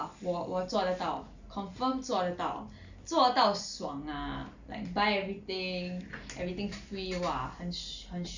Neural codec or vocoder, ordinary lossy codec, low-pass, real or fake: none; Opus, 64 kbps; 7.2 kHz; real